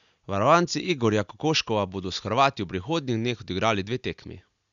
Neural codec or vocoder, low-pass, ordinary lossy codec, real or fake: none; 7.2 kHz; none; real